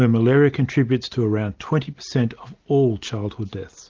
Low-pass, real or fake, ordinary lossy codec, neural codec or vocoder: 7.2 kHz; real; Opus, 32 kbps; none